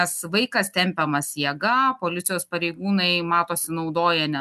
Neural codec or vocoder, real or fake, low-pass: none; real; 14.4 kHz